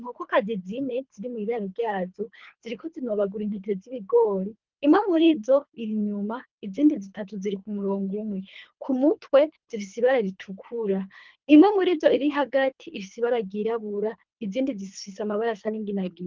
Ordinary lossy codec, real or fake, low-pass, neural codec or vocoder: Opus, 24 kbps; fake; 7.2 kHz; codec, 24 kHz, 3 kbps, HILCodec